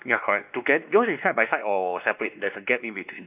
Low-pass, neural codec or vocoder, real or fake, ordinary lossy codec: 3.6 kHz; codec, 16 kHz, 2 kbps, X-Codec, WavLM features, trained on Multilingual LibriSpeech; fake; none